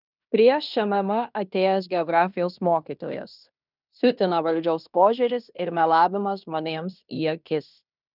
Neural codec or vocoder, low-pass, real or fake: codec, 16 kHz in and 24 kHz out, 0.9 kbps, LongCat-Audio-Codec, fine tuned four codebook decoder; 5.4 kHz; fake